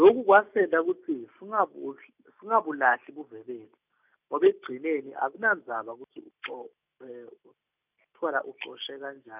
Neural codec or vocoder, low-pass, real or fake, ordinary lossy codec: none; 3.6 kHz; real; none